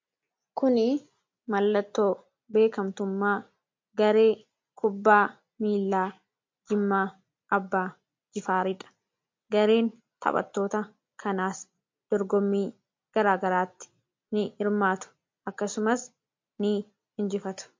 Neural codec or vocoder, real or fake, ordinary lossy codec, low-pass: none; real; MP3, 48 kbps; 7.2 kHz